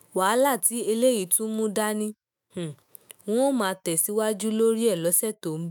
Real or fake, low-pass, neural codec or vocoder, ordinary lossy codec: fake; none; autoencoder, 48 kHz, 128 numbers a frame, DAC-VAE, trained on Japanese speech; none